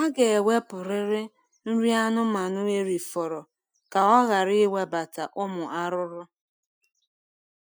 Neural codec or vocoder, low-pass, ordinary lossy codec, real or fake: none; none; none; real